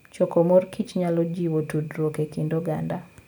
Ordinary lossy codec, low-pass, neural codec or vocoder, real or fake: none; none; none; real